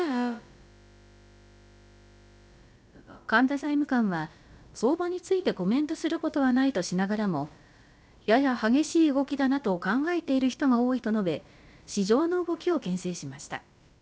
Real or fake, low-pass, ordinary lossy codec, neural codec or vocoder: fake; none; none; codec, 16 kHz, about 1 kbps, DyCAST, with the encoder's durations